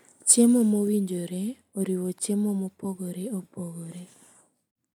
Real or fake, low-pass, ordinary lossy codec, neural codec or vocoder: real; none; none; none